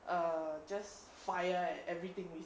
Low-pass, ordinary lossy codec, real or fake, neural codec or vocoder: none; none; real; none